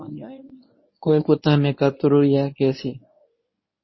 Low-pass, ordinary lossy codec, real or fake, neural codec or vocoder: 7.2 kHz; MP3, 24 kbps; fake; codec, 24 kHz, 0.9 kbps, WavTokenizer, medium speech release version 1